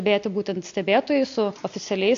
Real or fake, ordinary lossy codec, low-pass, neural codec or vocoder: real; AAC, 48 kbps; 7.2 kHz; none